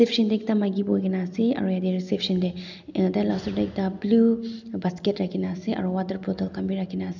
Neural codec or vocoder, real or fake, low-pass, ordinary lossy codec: none; real; 7.2 kHz; none